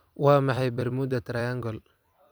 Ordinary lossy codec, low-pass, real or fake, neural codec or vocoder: none; none; fake; vocoder, 44.1 kHz, 128 mel bands every 256 samples, BigVGAN v2